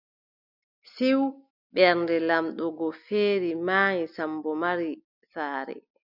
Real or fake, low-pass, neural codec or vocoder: real; 5.4 kHz; none